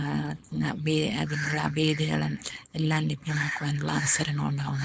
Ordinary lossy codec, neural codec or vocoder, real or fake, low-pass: none; codec, 16 kHz, 4.8 kbps, FACodec; fake; none